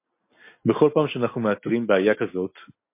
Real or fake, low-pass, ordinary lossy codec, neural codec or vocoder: real; 3.6 kHz; MP3, 24 kbps; none